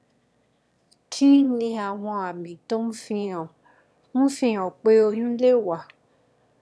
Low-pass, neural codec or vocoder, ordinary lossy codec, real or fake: none; autoencoder, 22.05 kHz, a latent of 192 numbers a frame, VITS, trained on one speaker; none; fake